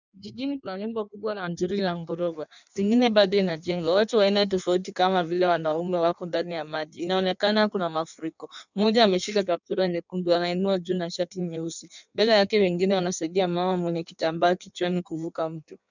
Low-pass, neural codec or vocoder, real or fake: 7.2 kHz; codec, 16 kHz in and 24 kHz out, 1.1 kbps, FireRedTTS-2 codec; fake